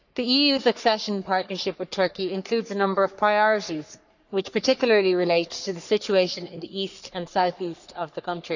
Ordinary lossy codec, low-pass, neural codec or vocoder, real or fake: none; 7.2 kHz; codec, 44.1 kHz, 3.4 kbps, Pupu-Codec; fake